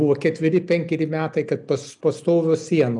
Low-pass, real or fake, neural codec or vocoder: 10.8 kHz; real; none